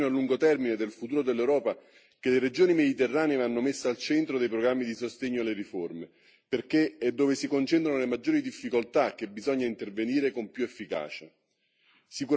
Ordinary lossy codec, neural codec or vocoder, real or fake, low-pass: none; none; real; none